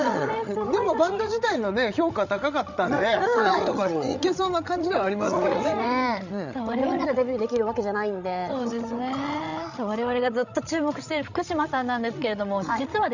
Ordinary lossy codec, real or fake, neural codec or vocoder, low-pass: none; fake; codec, 16 kHz, 16 kbps, FreqCodec, larger model; 7.2 kHz